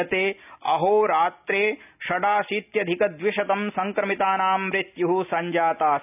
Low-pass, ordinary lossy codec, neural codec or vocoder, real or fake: 3.6 kHz; none; none; real